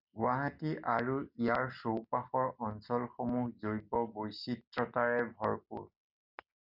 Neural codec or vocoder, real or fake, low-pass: none; real; 5.4 kHz